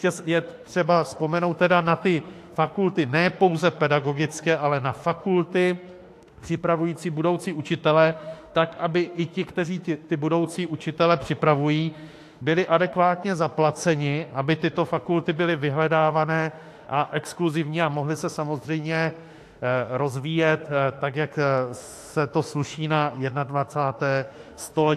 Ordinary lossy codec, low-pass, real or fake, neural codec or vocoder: AAC, 64 kbps; 14.4 kHz; fake; autoencoder, 48 kHz, 32 numbers a frame, DAC-VAE, trained on Japanese speech